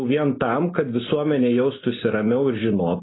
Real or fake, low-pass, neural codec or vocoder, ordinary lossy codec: real; 7.2 kHz; none; AAC, 16 kbps